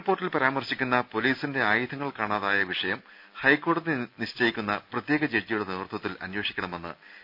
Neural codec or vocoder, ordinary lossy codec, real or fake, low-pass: none; none; real; 5.4 kHz